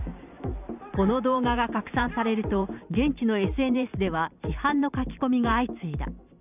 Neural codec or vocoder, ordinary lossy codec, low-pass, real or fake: vocoder, 44.1 kHz, 128 mel bands every 512 samples, BigVGAN v2; none; 3.6 kHz; fake